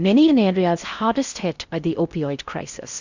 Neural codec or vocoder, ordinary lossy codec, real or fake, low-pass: codec, 16 kHz in and 24 kHz out, 0.6 kbps, FocalCodec, streaming, 4096 codes; Opus, 64 kbps; fake; 7.2 kHz